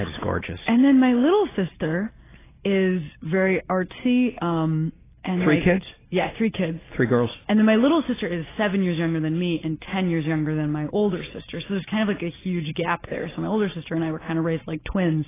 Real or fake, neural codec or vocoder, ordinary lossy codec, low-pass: real; none; AAC, 16 kbps; 3.6 kHz